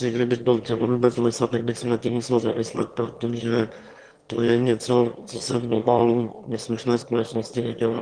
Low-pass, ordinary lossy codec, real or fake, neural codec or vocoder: 9.9 kHz; Opus, 24 kbps; fake; autoencoder, 22.05 kHz, a latent of 192 numbers a frame, VITS, trained on one speaker